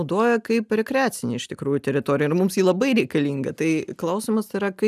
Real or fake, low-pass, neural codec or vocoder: real; 14.4 kHz; none